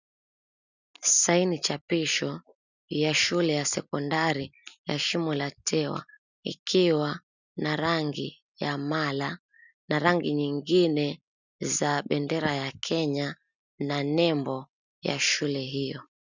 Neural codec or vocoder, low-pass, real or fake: none; 7.2 kHz; real